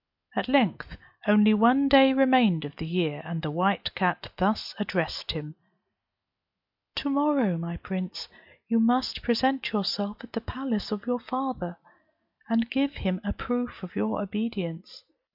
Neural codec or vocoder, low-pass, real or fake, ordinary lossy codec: none; 5.4 kHz; real; MP3, 48 kbps